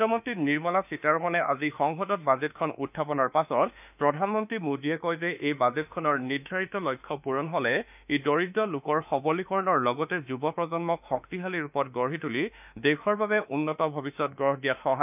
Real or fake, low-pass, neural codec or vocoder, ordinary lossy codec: fake; 3.6 kHz; autoencoder, 48 kHz, 32 numbers a frame, DAC-VAE, trained on Japanese speech; none